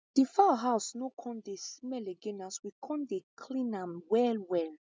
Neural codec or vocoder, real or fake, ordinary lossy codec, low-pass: none; real; none; none